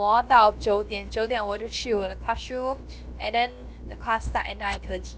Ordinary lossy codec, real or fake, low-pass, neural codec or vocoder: none; fake; none; codec, 16 kHz, about 1 kbps, DyCAST, with the encoder's durations